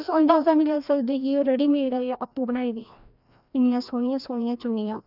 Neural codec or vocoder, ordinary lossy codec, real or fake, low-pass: codec, 16 kHz, 1 kbps, FreqCodec, larger model; none; fake; 5.4 kHz